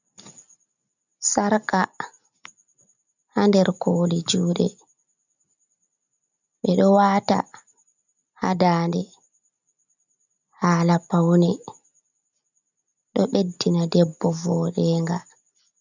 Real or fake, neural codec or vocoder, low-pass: real; none; 7.2 kHz